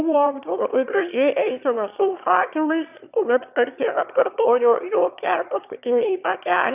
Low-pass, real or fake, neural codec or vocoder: 3.6 kHz; fake; autoencoder, 22.05 kHz, a latent of 192 numbers a frame, VITS, trained on one speaker